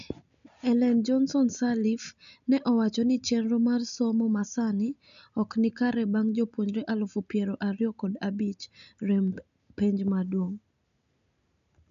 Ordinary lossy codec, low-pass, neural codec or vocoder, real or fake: none; 7.2 kHz; none; real